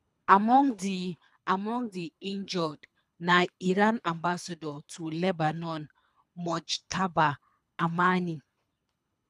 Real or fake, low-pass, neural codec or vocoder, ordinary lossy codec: fake; none; codec, 24 kHz, 3 kbps, HILCodec; none